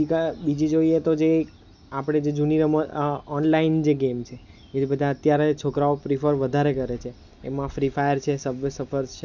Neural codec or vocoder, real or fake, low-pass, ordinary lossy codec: none; real; 7.2 kHz; none